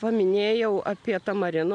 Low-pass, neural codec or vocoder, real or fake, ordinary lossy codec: 9.9 kHz; none; real; MP3, 96 kbps